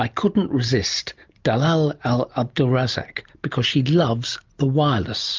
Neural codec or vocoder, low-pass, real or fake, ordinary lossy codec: none; 7.2 kHz; real; Opus, 32 kbps